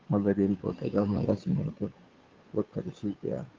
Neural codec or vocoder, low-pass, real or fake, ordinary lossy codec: codec, 16 kHz, 2 kbps, FunCodec, trained on Chinese and English, 25 frames a second; 7.2 kHz; fake; Opus, 24 kbps